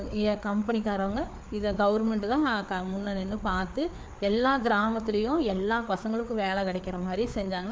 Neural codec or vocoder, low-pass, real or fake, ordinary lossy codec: codec, 16 kHz, 4 kbps, FreqCodec, larger model; none; fake; none